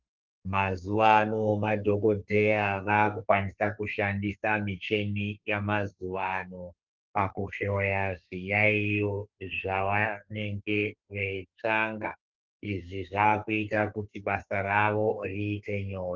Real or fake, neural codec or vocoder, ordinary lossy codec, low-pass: fake; codec, 32 kHz, 1.9 kbps, SNAC; Opus, 24 kbps; 7.2 kHz